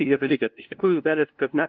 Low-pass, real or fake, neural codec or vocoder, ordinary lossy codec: 7.2 kHz; fake; codec, 16 kHz, 0.5 kbps, FunCodec, trained on LibriTTS, 25 frames a second; Opus, 24 kbps